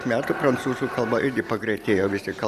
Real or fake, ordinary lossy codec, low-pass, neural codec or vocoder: real; Opus, 64 kbps; 14.4 kHz; none